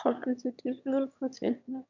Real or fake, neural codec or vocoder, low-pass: fake; autoencoder, 22.05 kHz, a latent of 192 numbers a frame, VITS, trained on one speaker; 7.2 kHz